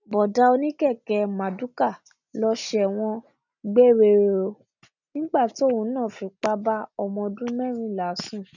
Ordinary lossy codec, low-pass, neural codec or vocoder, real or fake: none; 7.2 kHz; none; real